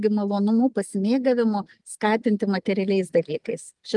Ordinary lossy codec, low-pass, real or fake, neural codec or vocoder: Opus, 32 kbps; 10.8 kHz; real; none